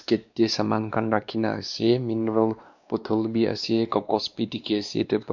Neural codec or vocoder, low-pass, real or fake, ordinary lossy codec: codec, 16 kHz, 2 kbps, X-Codec, WavLM features, trained on Multilingual LibriSpeech; 7.2 kHz; fake; none